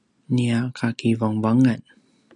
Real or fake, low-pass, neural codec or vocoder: real; 10.8 kHz; none